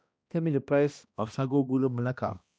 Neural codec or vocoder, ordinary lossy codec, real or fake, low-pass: codec, 16 kHz, 1 kbps, X-Codec, HuBERT features, trained on balanced general audio; none; fake; none